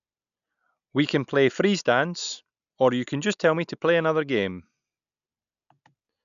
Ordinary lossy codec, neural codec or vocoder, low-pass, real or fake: none; none; 7.2 kHz; real